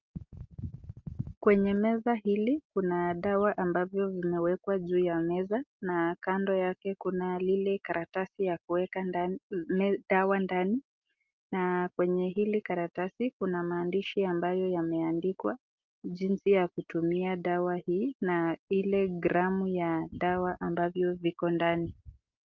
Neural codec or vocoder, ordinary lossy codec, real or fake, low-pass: none; Opus, 24 kbps; real; 7.2 kHz